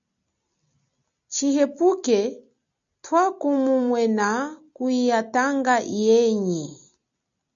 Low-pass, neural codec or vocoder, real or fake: 7.2 kHz; none; real